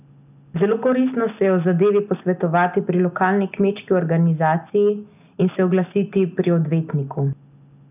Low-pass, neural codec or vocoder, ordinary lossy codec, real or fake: 3.6 kHz; none; none; real